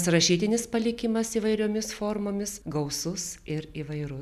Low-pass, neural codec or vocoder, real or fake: 14.4 kHz; none; real